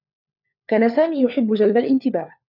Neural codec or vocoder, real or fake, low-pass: codec, 16 kHz, 4 kbps, FunCodec, trained on LibriTTS, 50 frames a second; fake; 5.4 kHz